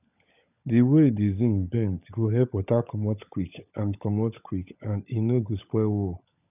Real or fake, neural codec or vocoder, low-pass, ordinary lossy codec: fake; codec, 16 kHz, 16 kbps, FunCodec, trained on LibriTTS, 50 frames a second; 3.6 kHz; none